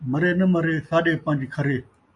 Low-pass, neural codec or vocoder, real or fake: 10.8 kHz; none; real